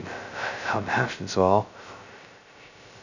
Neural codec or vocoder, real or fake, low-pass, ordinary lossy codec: codec, 16 kHz, 0.2 kbps, FocalCodec; fake; 7.2 kHz; none